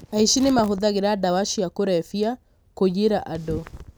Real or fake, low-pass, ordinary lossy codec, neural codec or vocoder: real; none; none; none